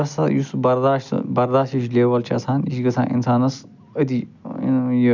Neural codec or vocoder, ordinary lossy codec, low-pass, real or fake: none; none; 7.2 kHz; real